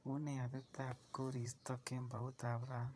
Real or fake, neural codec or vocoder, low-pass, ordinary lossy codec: fake; vocoder, 22.05 kHz, 80 mel bands, Vocos; none; none